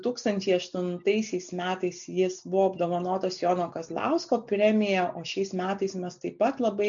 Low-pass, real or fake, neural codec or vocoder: 7.2 kHz; real; none